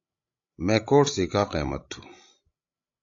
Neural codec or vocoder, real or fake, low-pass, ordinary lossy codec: codec, 16 kHz, 16 kbps, FreqCodec, larger model; fake; 7.2 kHz; AAC, 48 kbps